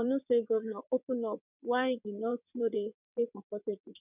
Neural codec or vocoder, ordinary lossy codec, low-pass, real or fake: vocoder, 22.05 kHz, 80 mel bands, WaveNeXt; none; 3.6 kHz; fake